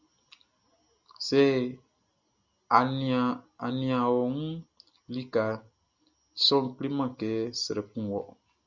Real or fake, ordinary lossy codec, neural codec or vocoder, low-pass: real; Opus, 64 kbps; none; 7.2 kHz